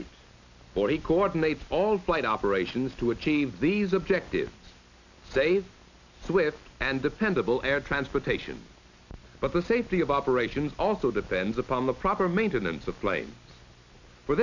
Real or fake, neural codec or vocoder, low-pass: real; none; 7.2 kHz